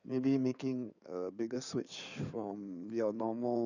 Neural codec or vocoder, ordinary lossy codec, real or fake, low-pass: codec, 16 kHz in and 24 kHz out, 2.2 kbps, FireRedTTS-2 codec; none; fake; 7.2 kHz